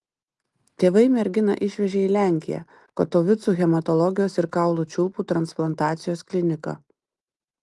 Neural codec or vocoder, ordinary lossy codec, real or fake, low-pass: none; Opus, 24 kbps; real; 10.8 kHz